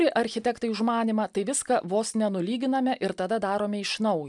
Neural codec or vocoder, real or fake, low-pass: none; real; 10.8 kHz